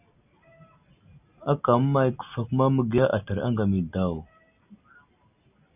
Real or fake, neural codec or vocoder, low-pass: real; none; 3.6 kHz